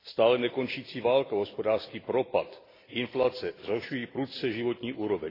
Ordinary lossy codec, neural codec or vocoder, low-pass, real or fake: AAC, 24 kbps; none; 5.4 kHz; real